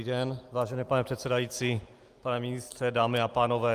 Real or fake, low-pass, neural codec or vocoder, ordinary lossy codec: real; 14.4 kHz; none; Opus, 32 kbps